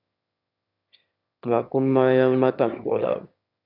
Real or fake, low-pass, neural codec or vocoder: fake; 5.4 kHz; autoencoder, 22.05 kHz, a latent of 192 numbers a frame, VITS, trained on one speaker